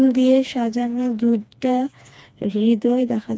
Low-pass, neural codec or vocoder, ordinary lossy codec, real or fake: none; codec, 16 kHz, 2 kbps, FreqCodec, smaller model; none; fake